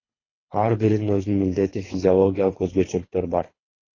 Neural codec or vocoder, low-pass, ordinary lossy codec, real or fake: codec, 24 kHz, 3 kbps, HILCodec; 7.2 kHz; AAC, 32 kbps; fake